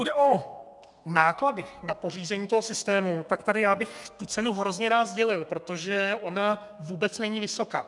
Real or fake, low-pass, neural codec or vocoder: fake; 10.8 kHz; codec, 32 kHz, 1.9 kbps, SNAC